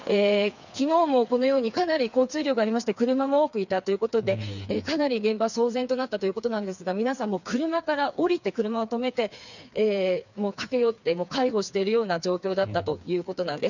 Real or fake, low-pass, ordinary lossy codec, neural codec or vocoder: fake; 7.2 kHz; none; codec, 16 kHz, 4 kbps, FreqCodec, smaller model